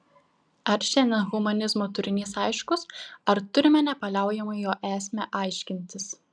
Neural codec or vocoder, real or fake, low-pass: none; real; 9.9 kHz